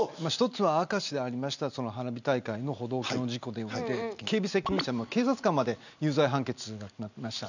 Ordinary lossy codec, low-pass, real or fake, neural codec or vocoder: none; 7.2 kHz; real; none